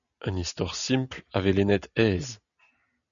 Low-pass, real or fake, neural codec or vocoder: 7.2 kHz; real; none